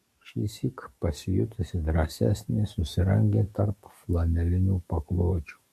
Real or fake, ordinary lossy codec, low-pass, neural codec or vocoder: fake; MP3, 64 kbps; 14.4 kHz; codec, 44.1 kHz, 7.8 kbps, DAC